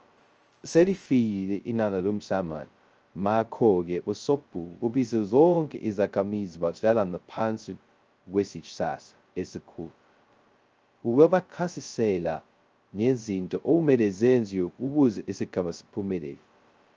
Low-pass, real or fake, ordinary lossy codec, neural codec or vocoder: 7.2 kHz; fake; Opus, 24 kbps; codec, 16 kHz, 0.2 kbps, FocalCodec